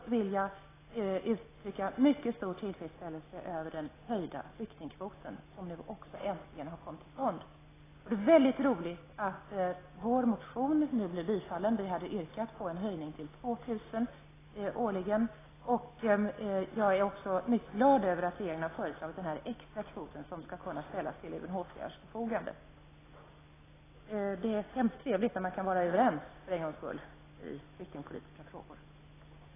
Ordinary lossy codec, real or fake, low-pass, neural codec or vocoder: AAC, 16 kbps; real; 3.6 kHz; none